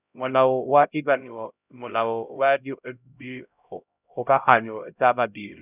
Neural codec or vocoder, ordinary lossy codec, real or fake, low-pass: codec, 16 kHz, 0.5 kbps, X-Codec, HuBERT features, trained on LibriSpeech; none; fake; 3.6 kHz